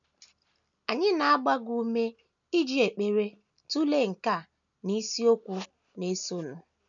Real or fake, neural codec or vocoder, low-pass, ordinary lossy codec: real; none; 7.2 kHz; none